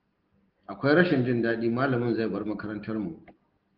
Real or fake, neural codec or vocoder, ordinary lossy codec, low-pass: real; none; Opus, 16 kbps; 5.4 kHz